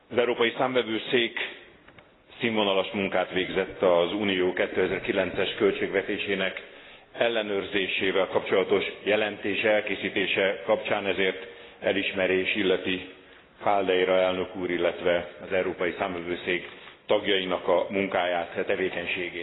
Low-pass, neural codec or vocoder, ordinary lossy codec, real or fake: 7.2 kHz; none; AAC, 16 kbps; real